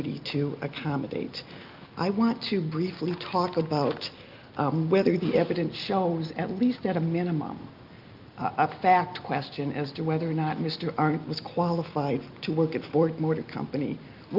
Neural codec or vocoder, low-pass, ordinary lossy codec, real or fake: none; 5.4 kHz; Opus, 24 kbps; real